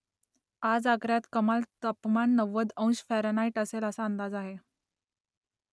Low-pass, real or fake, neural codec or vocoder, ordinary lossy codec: none; real; none; none